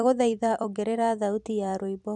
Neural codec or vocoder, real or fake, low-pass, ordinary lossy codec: vocoder, 44.1 kHz, 128 mel bands every 256 samples, BigVGAN v2; fake; 10.8 kHz; none